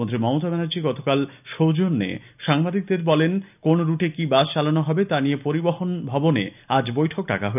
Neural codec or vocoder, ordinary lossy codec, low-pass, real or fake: none; none; 3.6 kHz; real